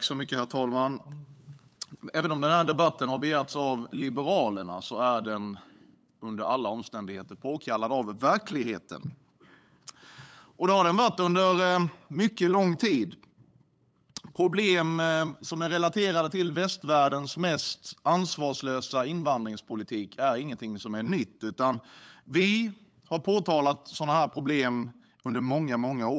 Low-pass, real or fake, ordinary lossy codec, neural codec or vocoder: none; fake; none; codec, 16 kHz, 8 kbps, FunCodec, trained on LibriTTS, 25 frames a second